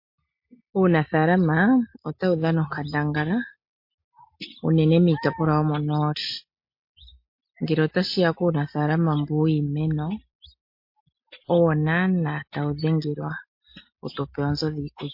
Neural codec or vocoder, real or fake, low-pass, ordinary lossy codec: none; real; 5.4 kHz; MP3, 32 kbps